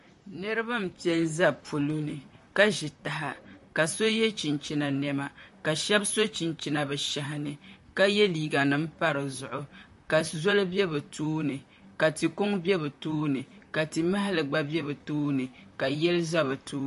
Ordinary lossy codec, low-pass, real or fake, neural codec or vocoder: MP3, 48 kbps; 14.4 kHz; fake; vocoder, 44.1 kHz, 128 mel bands, Pupu-Vocoder